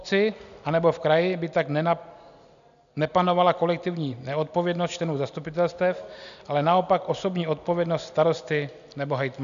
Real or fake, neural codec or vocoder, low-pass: real; none; 7.2 kHz